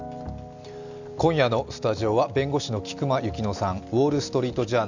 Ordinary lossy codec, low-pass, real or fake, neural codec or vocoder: none; 7.2 kHz; real; none